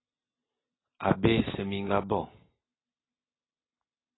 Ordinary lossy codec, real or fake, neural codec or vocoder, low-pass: AAC, 16 kbps; real; none; 7.2 kHz